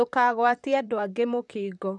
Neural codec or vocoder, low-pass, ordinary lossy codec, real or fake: vocoder, 44.1 kHz, 128 mel bands, Pupu-Vocoder; 10.8 kHz; none; fake